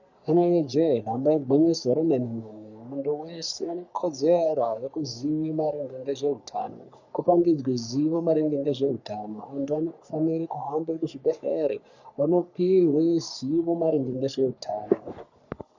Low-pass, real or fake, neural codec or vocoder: 7.2 kHz; fake; codec, 44.1 kHz, 3.4 kbps, Pupu-Codec